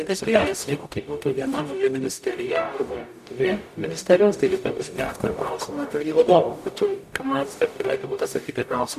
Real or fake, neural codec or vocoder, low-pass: fake; codec, 44.1 kHz, 0.9 kbps, DAC; 14.4 kHz